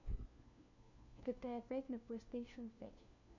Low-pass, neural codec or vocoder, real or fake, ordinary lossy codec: 7.2 kHz; codec, 16 kHz, 1 kbps, FunCodec, trained on LibriTTS, 50 frames a second; fake; none